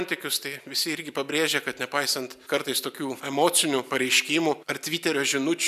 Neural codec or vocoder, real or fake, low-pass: none; real; 14.4 kHz